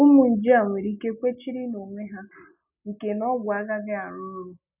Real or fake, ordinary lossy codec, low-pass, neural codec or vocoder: real; none; 3.6 kHz; none